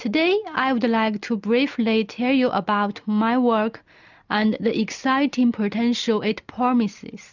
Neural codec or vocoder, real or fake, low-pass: none; real; 7.2 kHz